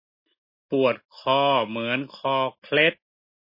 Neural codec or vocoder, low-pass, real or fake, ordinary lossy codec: none; 5.4 kHz; real; MP3, 24 kbps